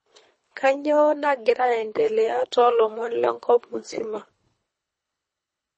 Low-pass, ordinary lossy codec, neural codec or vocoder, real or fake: 10.8 kHz; MP3, 32 kbps; codec, 24 kHz, 3 kbps, HILCodec; fake